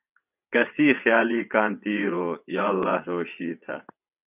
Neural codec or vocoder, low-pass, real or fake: vocoder, 22.05 kHz, 80 mel bands, WaveNeXt; 3.6 kHz; fake